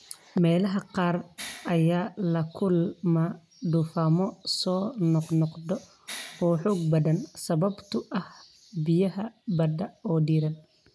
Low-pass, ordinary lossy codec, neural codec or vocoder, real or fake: none; none; none; real